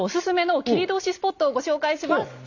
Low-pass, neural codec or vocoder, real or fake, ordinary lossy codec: 7.2 kHz; none; real; none